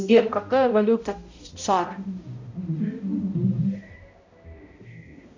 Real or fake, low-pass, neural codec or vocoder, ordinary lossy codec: fake; 7.2 kHz; codec, 16 kHz, 0.5 kbps, X-Codec, HuBERT features, trained on balanced general audio; MP3, 64 kbps